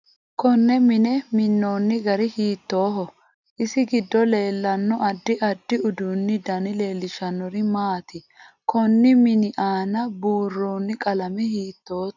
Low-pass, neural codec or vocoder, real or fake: 7.2 kHz; none; real